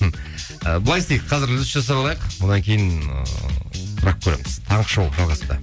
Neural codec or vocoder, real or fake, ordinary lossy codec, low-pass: none; real; none; none